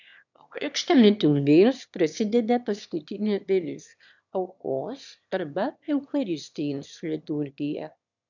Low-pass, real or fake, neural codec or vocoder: 7.2 kHz; fake; autoencoder, 22.05 kHz, a latent of 192 numbers a frame, VITS, trained on one speaker